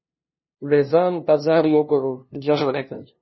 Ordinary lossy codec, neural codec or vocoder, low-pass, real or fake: MP3, 24 kbps; codec, 16 kHz, 0.5 kbps, FunCodec, trained on LibriTTS, 25 frames a second; 7.2 kHz; fake